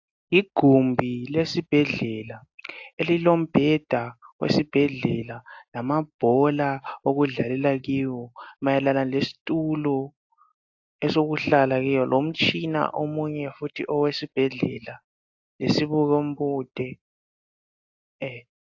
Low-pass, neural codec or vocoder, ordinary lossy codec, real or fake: 7.2 kHz; none; AAC, 48 kbps; real